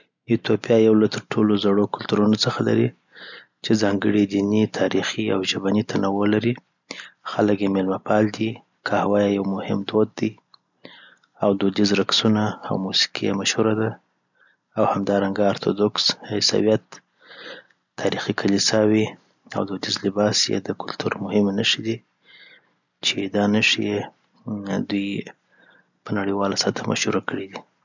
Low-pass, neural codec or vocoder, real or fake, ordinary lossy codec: 7.2 kHz; none; real; none